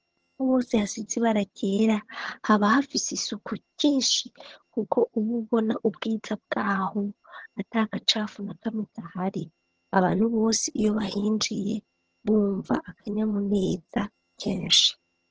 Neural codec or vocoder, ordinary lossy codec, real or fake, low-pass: vocoder, 22.05 kHz, 80 mel bands, HiFi-GAN; Opus, 16 kbps; fake; 7.2 kHz